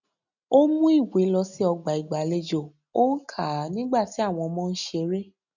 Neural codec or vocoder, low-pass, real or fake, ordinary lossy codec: none; 7.2 kHz; real; none